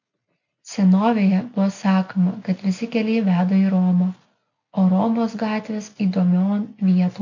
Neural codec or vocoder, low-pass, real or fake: none; 7.2 kHz; real